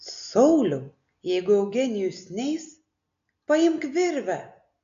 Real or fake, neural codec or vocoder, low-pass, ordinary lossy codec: real; none; 7.2 kHz; AAC, 64 kbps